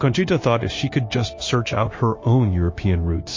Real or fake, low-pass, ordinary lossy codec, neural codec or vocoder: fake; 7.2 kHz; MP3, 32 kbps; codec, 16 kHz, 0.9 kbps, LongCat-Audio-Codec